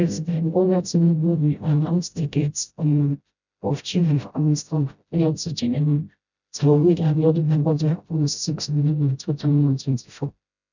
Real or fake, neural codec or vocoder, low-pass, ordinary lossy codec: fake; codec, 16 kHz, 0.5 kbps, FreqCodec, smaller model; 7.2 kHz; none